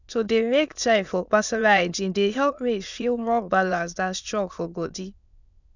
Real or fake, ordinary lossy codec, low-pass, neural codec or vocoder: fake; none; 7.2 kHz; autoencoder, 22.05 kHz, a latent of 192 numbers a frame, VITS, trained on many speakers